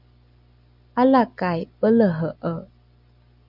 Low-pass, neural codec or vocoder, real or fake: 5.4 kHz; none; real